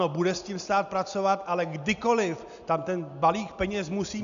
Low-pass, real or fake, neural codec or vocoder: 7.2 kHz; real; none